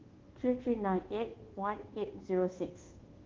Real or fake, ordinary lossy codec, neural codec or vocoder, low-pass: fake; Opus, 16 kbps; codec, 24 kHz, 1.2 kbps, DualCodec; 7.2 kHz